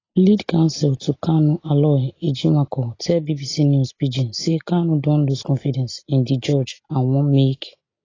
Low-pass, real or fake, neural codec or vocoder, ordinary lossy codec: 7.2 kHz; real; none; AAC, 32 kbps